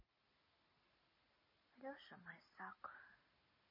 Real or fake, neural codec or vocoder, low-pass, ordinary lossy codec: real; none; 5.4 kHz; MP3, 24 kbps